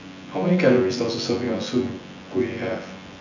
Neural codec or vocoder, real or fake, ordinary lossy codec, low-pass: vocoder, 24 kHz, 100 mel bands, Vocos; fake; none; 7.2 kHz